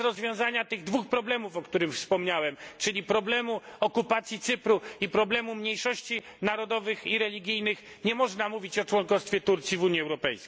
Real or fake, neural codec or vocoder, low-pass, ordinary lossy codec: real; none; none; none